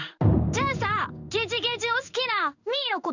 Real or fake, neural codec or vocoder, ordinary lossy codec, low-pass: fake; codec, 16 kHz in and 24 kHz out, 1 kbps, XY-Tokenizer; none; 7.2 kHz